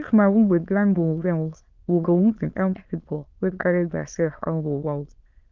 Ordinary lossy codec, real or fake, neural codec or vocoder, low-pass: Opus, 32 kbps; fake; autoencoder, 22.05 kHz, a latent of 192 numbers a frame, VITS, trained on many speakers; 7.2 kHz